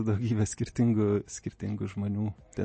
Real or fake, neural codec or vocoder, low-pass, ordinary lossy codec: real; none; 10.8 kHz; MP3, 32 kbps